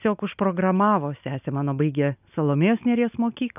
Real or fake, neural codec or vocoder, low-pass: real; none; 3.6 kHz